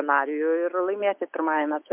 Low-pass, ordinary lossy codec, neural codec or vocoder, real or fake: 3.6 kHz; MP3, 32 kbps; none; real